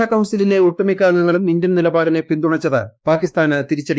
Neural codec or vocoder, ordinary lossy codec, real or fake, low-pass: codec, 16 kHz, 2 kbps, X-Codec, WavLM features, trained on Multilingual LibriSpeech; none; fake; none